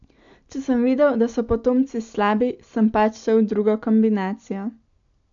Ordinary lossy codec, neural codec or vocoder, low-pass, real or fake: AAC, 64 kbps; none; 7.2 kHz; real